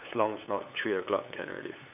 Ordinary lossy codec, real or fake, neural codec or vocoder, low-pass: none; fake; codec, 16 kHz, 8 kbps, FunCodec, trained on LibriTTS, 25 frames a second; 3.6 kHz